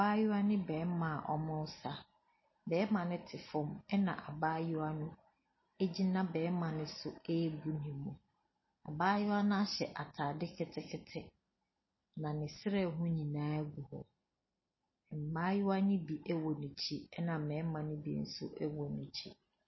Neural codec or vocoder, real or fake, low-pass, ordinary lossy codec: none; real; 7.2 kHz; MP3, 24 kbps